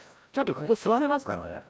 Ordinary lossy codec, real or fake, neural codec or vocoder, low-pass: none; fake; codec, 16 kHz, 0.5 kbps, FreqCodec, larger model; none